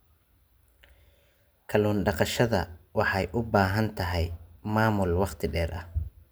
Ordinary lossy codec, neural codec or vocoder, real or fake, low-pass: none; none; real; none